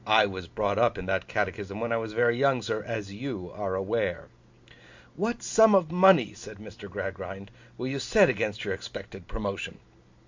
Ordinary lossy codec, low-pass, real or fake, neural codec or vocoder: MP3, 64 kbps; 7.2 kHz; real; none